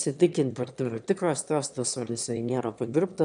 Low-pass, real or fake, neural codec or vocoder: 9.9 kHz; fake; autoencoder, 22.05 kHz, a latent of 192 numbers a frame, VITS, trained on one speaker